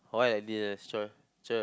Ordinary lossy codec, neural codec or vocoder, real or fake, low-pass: none; none; real; none